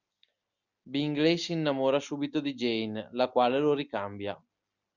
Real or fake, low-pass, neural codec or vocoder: real; 7.2 kHz; none